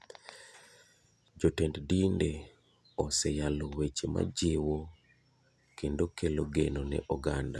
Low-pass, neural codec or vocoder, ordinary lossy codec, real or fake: none; none; none; real